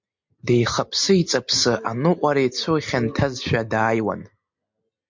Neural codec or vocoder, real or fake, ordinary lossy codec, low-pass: none; real; MP3, 48 kbps; 7.2 kHz